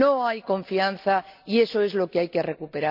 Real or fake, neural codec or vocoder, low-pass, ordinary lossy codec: real; none; 5.4 kHz; none